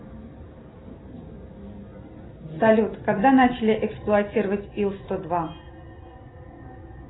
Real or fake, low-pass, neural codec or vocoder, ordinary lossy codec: real; 7.2 kHz; none; AAC, 16 kbps